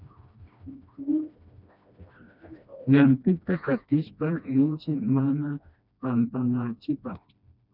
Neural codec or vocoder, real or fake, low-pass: codec, 16 kHz, 1 kbps, FreqCodec, smaller model; fake; 5.4 kHz